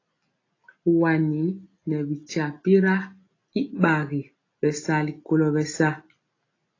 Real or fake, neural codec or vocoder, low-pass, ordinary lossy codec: real; none; 7.2 kHz; AAC, 32 kbps